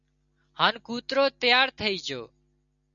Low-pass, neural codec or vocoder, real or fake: 7.2 kHz; none; real